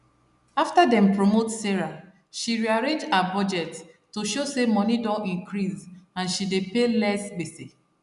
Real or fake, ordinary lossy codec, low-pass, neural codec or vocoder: real; none; 10.8 kHz; none